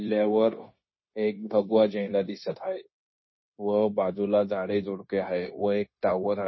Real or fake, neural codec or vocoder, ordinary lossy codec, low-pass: fake; codec, 16 kHz, 0.9 kbps, LongCat-Audio-Codec; MP3, 24 kbps; 7.2 kHz